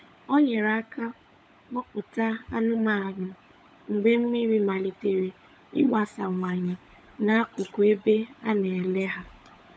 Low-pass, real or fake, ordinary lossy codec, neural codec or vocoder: none; fake; none; codec, 16 kHz, 16 kbps, FunCodec, trained on LibriTTS, 50 frames a second